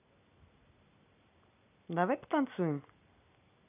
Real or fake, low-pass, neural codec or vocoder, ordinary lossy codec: real; 3.6 kHz; none; none